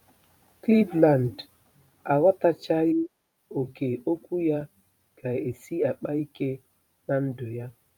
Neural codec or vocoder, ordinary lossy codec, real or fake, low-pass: vocoder, 44.1 kHz, 128 mel bands every 512 samples, BigVGAN v2; none; fake; 19.8 kHz